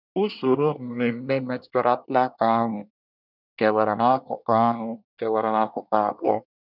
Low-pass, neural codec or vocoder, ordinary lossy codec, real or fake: 5.4 kHz; codec, 24 kHz, 1 kbps, SNAC; none; fake